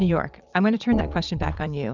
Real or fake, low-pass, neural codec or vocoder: fake; 7.2 kHz; vocoder, 22.05 kHz, 80 mel bands, Vocos